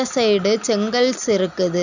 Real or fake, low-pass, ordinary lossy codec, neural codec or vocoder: real; 7.2 kHz; none; none